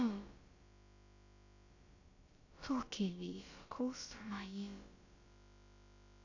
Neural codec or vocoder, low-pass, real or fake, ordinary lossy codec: codec, 16 kHz, about 1 kbps, DyCAST, with the encoder's durations; 7.2 kHz; fake; AAC, 48 kbps